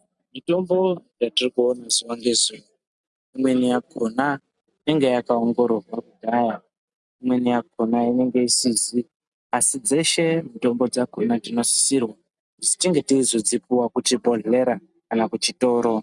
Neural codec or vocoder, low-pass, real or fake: none; 10.8 kHz; real